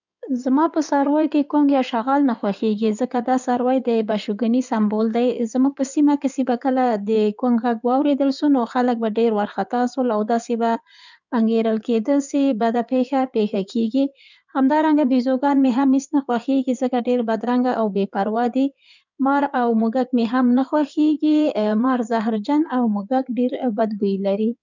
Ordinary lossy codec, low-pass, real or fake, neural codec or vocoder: none; 7.2 kHz; fake; codec, 16 kHz in and 24 kHz out, 2.2 kbps, FireRedTTS-2 codec